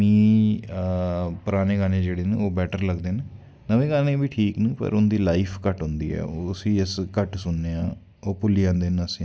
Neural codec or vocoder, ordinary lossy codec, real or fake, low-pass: none; none; real; none